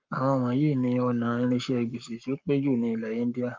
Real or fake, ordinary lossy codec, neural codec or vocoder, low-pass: fake; Opus, 24 kbps; codec, 44.1 kHz, 7.8 kbps, Pupu-Codec; 7.2 kHz